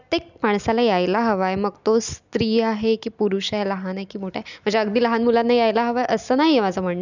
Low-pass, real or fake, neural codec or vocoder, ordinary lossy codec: 7.2 kHz; real; none; none